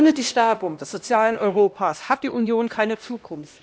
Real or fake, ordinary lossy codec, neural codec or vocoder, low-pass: fake; none; codec, 16 kHz, 1 kbps, X-Codec, HuBERT features, trained on LibriSpeech; none